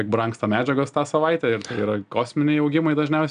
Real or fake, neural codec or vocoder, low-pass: real; none; 9.9 kHz